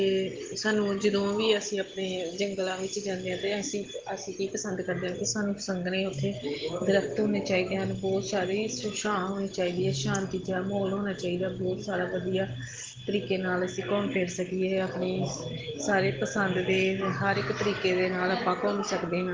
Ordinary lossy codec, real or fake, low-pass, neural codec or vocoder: Opus, 16 kbps; real; 7.2 kHz; none